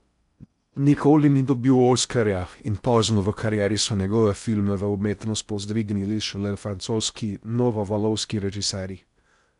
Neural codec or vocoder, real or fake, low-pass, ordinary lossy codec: codec, 16 kHz in and 24 kHz out, 0.6 kbps, FocalCodec, streaming, 4096 codes; fake; 10.8 kHz; none